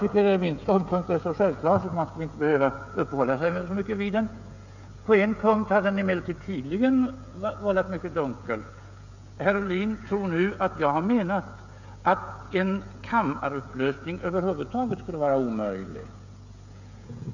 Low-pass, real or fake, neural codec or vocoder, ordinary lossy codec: 7.2 kHz; fake; codec, 16 kHz, 16 kbps, FreqCodec, smaller model; none